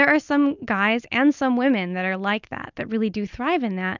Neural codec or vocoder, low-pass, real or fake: none; 7.2 kHz; real